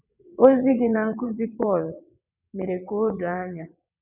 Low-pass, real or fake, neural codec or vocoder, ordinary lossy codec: 3.6 kHz; fake; vocoder, 22.05 kHz, 80 mel bands, WaveNeXt; none